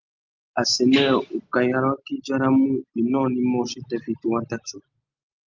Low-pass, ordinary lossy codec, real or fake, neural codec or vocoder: 7.2 kHz; Opus, 24 kbps; real; none